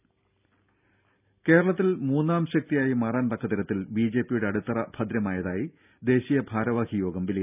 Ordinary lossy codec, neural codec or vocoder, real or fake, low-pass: none; none; real; 3.6 kHz